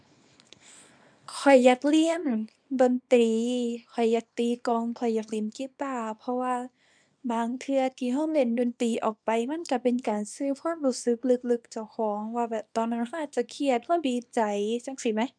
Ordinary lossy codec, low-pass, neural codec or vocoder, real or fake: none; 9.9 kHz; codec, 24 kHz, 0.9 kbps, WavTokenizer, small release; fake